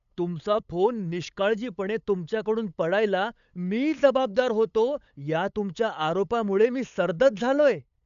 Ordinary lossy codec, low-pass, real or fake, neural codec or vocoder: none; 7.2 kHz; fake; codec, 16 kHz, 8 kbps, FunCodec, trained on LibriTTS, 25 frames a second